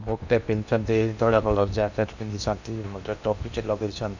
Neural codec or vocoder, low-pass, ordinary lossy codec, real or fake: codec, 16 kHz in and 24 kHz out, 0.8 kbps, FocalCodec, streaming, 65536 codes; 7.2 kHz; none; fake